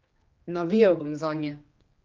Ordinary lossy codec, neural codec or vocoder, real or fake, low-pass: Opus, 24 kbps; codec, 16 kHz, 2 kbps, X-Codec, HuBERT features, trained on general audio; fake; 7.2 kHz